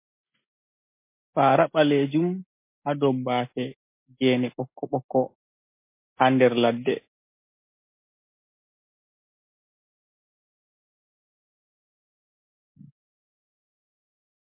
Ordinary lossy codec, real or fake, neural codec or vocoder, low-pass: MP3, 24 kbps; real; none; 3.6 kHz